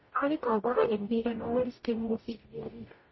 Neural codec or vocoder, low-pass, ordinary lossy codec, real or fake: codec, 44.1 kHz, 0.9 kbps, DAC; 7.2 kHz; MP3, 24 kbps; fake